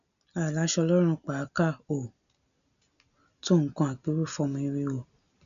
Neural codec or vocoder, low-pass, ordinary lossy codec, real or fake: none; 7.2 kHz; none; real